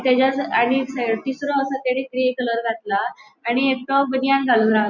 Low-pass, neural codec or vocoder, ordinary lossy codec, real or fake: 7.2 kHz; none; none; real